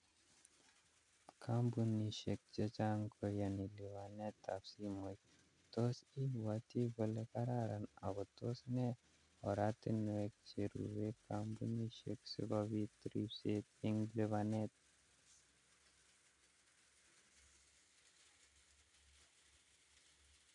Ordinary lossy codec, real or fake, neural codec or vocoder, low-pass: none; real; none; 10.8 kHz